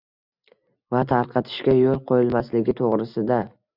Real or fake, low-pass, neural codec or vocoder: real; 5.4 kHz; none